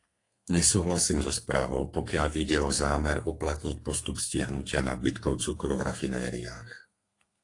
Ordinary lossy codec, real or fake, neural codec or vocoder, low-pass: AAC, 48 kbps; fake; codec, 32 kHz, 1.9 kbps, SNAC; 10.8 kHz